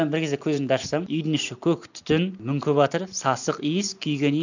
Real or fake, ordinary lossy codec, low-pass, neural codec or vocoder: real; none; 7.2 kHz; none